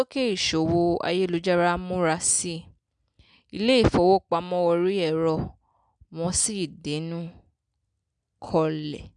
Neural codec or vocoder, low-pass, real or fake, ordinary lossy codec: none; 9.9 kHz; real; none